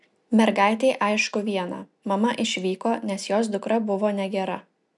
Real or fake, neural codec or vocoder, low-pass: real; none; 10.8 kHz